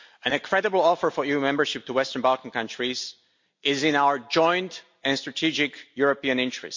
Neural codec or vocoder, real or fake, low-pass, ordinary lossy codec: none; real; 7.2 kHz; MP3, 48 kbps